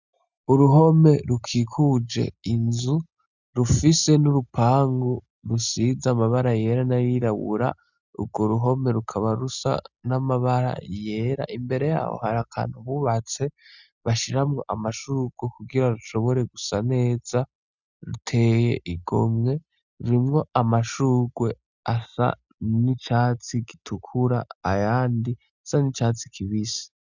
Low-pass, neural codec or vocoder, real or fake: 7.2 kHz; none; real